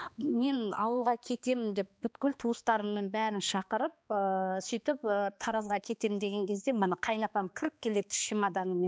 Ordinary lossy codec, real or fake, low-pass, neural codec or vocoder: none; fake; none; codec, 16 kHz, 2 kbps, X-Codec, HuBERT features, trained on balanced general audio